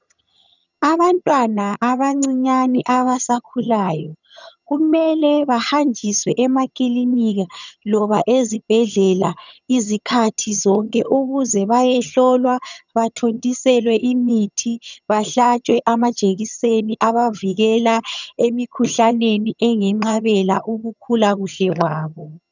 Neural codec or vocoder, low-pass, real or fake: vocoder, 22.05 kHz, 80 mel bands, HiFi-GAN; 7.2 kHz; fake